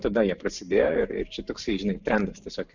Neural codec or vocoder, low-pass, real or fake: vocoder, 44.1 kHz, 128 mel bands every 512 samples, BigVGAN v2; 7.2 kHz; fake